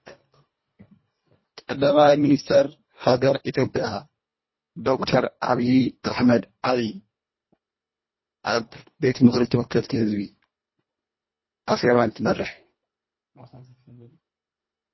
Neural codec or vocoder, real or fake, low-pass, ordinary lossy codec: codec, 24 kHz, 1.5 kbps, HILCodec; fake; 7.2 kHz; MP3, 24 kbps